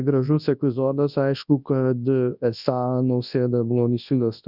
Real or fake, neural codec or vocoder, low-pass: fake; codec, 24 kHz, 0.9 kbps, WavTokenizer, large speech release; 5.4 kHz